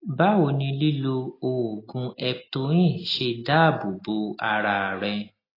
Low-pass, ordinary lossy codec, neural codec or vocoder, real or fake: 5.4 kHz; AAC, 24 kbps; none; real